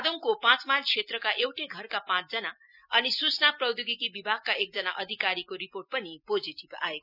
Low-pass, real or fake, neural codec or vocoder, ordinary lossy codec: 5.4 kHz; real; none; none